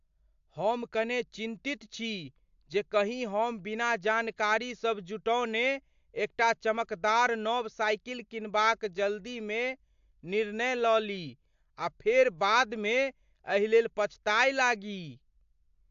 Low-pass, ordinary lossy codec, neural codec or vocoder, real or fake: 7.2 kHz; AAC, 64 kbps; none; real